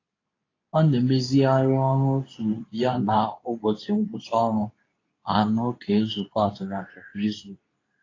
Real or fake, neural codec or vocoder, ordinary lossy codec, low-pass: fake; codec, 24 kHz, 0.9 kbps, WavTokenizer, medium speech release version 2; AAC, 32 kbps; 7.2 kHz